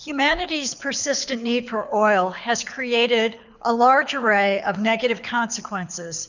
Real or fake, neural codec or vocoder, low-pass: fake; codec, 24 kHz, 6 kbps, HILCodec; 7.2 kHz